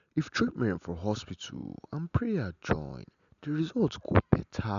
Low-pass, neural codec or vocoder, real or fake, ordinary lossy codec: 7.2 kHz; none; real; none